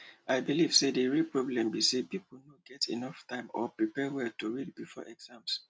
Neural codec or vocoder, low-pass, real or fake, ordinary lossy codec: none; none; real; none